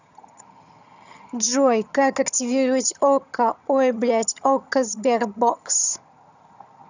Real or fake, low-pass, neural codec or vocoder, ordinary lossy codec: fake; 7.2 kHz; vocoder, 22.05 kHz, 80 mel bands, HiFi-GAN; none